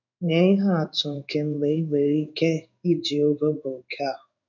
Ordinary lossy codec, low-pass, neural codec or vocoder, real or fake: none; 7.2 kHz; codec, 16 kHz in and 24 kHz out, 1 kbps, XY-Tokenizer; fake